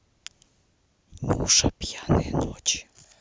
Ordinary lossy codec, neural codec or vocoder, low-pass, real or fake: none; none; none; real